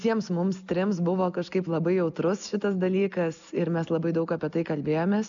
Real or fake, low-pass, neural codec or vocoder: real; 7.2 kHz; none